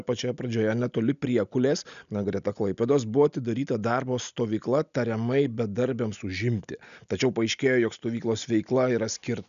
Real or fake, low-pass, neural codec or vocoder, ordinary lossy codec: real; 7.2 kHz; none; MP3, 96 kbps